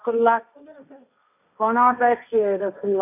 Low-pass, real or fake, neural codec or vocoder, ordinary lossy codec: 3.6 kHz; fake; codec, 16 kHz, 1.1 kbps, Voila-Tokenizer; none